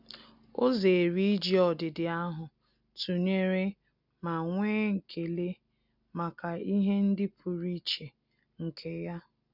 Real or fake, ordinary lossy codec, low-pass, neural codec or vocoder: real; none; 5.4 kHz; none